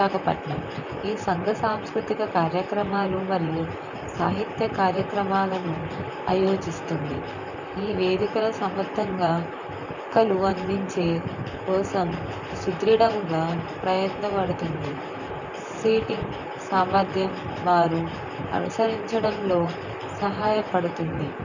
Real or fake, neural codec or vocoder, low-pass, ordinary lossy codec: fake; vocoder, 44.1 kHz, 128 mel bands, Pupu-Vocoder; 7.2 kHz; none